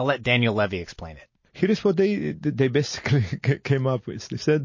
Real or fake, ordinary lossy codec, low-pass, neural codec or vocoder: real; MP3, 32 kbps; 7.2 kHz; none